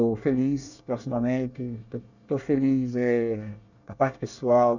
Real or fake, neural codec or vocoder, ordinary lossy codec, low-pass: fake; codec, 24 kHz, 1 kbps, SNAC; none; 7.2 kHz